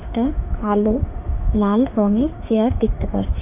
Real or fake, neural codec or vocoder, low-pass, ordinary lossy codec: fake; autoencoder, 48 kHz, 32 numbers a frame, DAC-VAE, trained on Japanese speech; 3.6 kHz; none